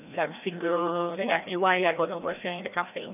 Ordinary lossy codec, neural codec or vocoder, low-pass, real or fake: none; codec, 16 kHz, 1 kbps, FreqCodec, larger model; 3.6 kHz; fake